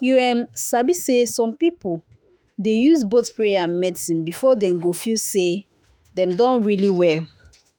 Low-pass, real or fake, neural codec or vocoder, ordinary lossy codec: none; fake; autoencoder, 48 kHz, 32 numbers a frame, DAC-VAE, trained on Japanese speech; none